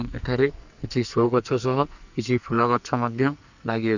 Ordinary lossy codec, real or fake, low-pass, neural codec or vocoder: none; fake; 7.2 kHz; codec, 44.1 kHz, 2.6 kbps, SNAC